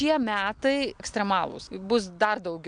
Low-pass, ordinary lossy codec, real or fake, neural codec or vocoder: 9.9 kHz; MP3, 64 kbps; real; none